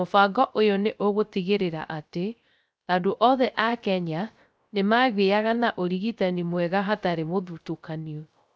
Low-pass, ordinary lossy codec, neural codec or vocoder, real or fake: none; none; codec, 16 kHz, 0.3 kbps, FocalCodec; fake